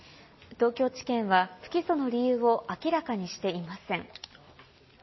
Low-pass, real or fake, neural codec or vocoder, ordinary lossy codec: 7.2 kHz; real; none; MP3, 24 kbps